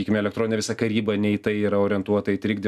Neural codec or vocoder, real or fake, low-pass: none; real; 14.4 kHz